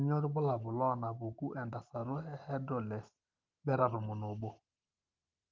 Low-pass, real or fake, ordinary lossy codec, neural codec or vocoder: 7.2 kHz; real; Opus, 24 kbps; none